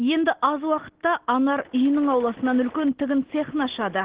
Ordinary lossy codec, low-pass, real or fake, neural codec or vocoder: Opus, 16 kbps; 3.6 kHz; real; none